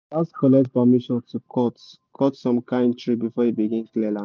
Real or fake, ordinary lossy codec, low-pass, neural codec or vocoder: real; none; none; none